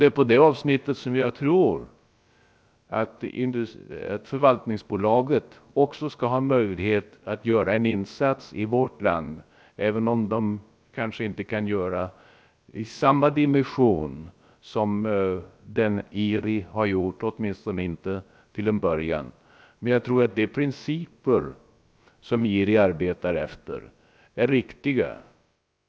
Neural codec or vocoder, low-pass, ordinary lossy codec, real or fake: codec, 16 kHz, about 1 kbps, DyCAST, with the encoder's durations; none; none; fake